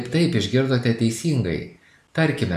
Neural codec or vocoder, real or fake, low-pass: none; real; 14.4 kHz